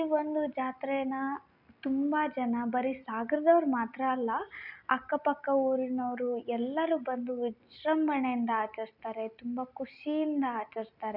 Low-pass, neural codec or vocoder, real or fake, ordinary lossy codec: 5.4 kHz; none; real; none